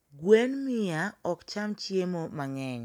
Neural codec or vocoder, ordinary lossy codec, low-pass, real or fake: none; none; 19.8 kHz; real